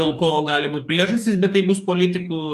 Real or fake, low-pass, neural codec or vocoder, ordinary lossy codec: fake; 14.4 kHz; codec, 44.1 kHz, 2.6 kbps, DAC; AAC, 96 kbps